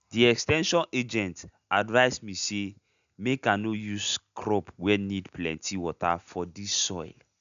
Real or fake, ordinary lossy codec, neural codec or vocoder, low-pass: real; none; none; 7.2 kHz